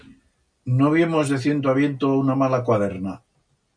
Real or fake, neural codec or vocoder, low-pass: real; none; 9.9 kHz